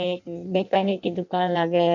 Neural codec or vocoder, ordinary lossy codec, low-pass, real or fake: codec, 16 kHz in and 24 kHz out, 0.6 kbps, FireRedTTS-2 codec; none; 7.2 kHz; fake